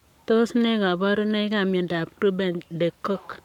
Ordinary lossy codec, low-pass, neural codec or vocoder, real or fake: none; 19.8 kHz; codec, 44.1 kHz, 7.8 kbps, Pupu-Codec; fake